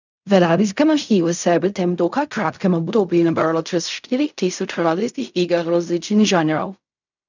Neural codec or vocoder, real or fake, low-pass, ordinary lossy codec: codec, 16 kHz in and 24 kHz out, 0.4 kbps, LongCat-Audio-Codec, fine tuned four codebook decoder; fake; 7.2 kHz; none